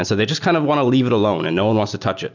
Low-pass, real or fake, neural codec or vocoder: 7.2 kHz; real; none